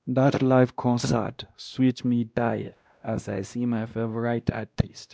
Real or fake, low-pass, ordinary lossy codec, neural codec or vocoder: fake; none; none; codec, 16 kHz, 1 kbps, X-Codec, WavLM features, trained on Multilingual LibriSpeech